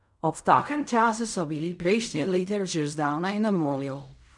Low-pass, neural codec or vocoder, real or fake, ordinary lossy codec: 10.8 kHz; codec, 16 kHz in and 24 kHz out, 0.4 kbps, LongCat-Audio-Codec, fine tuned four codebook decoder; fake; none